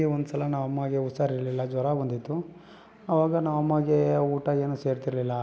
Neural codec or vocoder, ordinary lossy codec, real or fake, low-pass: none; none; real; none